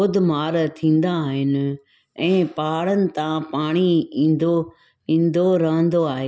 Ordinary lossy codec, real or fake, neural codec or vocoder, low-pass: none; real; none; none